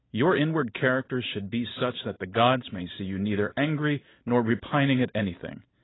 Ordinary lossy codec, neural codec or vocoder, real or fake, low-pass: AAC, 16 kbps; none; real; 7.2 kHz